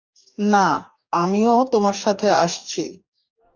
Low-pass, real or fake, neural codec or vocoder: 7.2 kHz; fake; codec, 44.1 kHz, 2.6 kbps, DAC